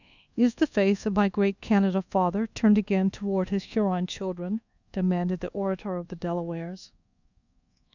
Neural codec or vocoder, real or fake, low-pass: codec, 24 kHz, 1.2 kbps, DualCodec; fake; 7.2 kHz